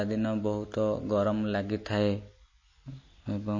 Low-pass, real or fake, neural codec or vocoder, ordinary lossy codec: 7.2 kHz; real; none; MP3, 32 kbps